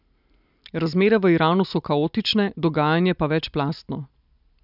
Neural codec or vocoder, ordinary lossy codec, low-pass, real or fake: none; none; 5.4 kHz; real